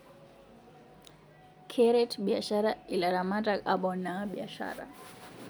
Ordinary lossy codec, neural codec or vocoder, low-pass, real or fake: none; none; none; real